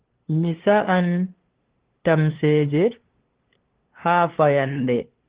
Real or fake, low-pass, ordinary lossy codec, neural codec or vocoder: fake; 3.6 kHz; Opus, 16 kbps; codec, 16 kHz, 2 kbps, FunCodec, trained on LibriTTS, 25 frames a second